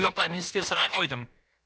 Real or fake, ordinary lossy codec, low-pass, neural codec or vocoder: fake; none; none; codec, 16 kHz, about 1 kbps, DyCAST, with the encoder's durations